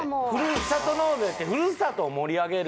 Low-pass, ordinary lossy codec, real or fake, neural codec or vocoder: none; none; real; none